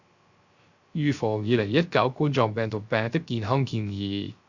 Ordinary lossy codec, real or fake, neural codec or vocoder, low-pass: Opus, 64 kbps; fake; codec, 16 kHz, 0.3 kbps, FocalCodec; 7.2 kHz